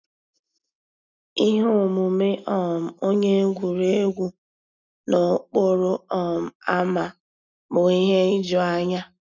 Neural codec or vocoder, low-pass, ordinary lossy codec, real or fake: none; 7.2 kHz; none; real